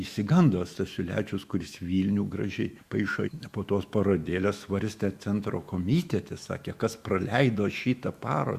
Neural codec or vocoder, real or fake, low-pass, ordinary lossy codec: autoencoder, 48 kHz, 128 numbers a frame, DAC-VAE, trained on Japanese speech; fake; 14.4 kHz; Opus, 64 kbps